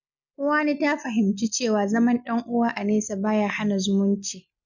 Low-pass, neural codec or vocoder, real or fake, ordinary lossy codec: 7.2 kHz; none; real; none